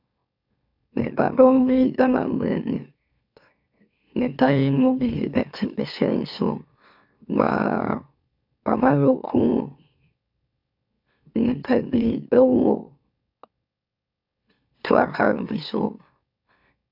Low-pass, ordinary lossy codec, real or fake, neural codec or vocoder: 5.4 kHz; AAC, 48 kbps; fake; autoencoder, 44.1 kHz, a latent of 192 numbers a frame, MeloTTS